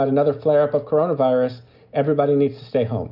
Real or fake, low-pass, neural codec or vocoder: real; 5.4 kHz; none